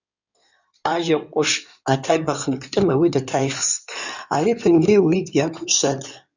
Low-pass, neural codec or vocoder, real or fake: 7.2 kHz; codec, 16 kHz in and 24 kHz out, 2.2 kbps, FireRedTTS-2 codec; fake